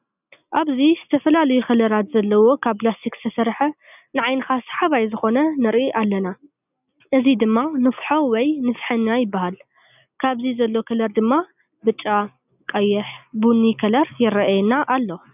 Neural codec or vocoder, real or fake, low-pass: none; real; 3.6 kHz